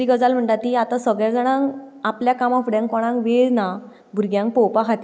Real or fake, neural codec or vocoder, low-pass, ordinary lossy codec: real; none; none; none